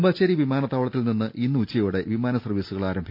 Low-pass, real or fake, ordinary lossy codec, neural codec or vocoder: 5.4 kHz; real; MP3, 48 kbps; none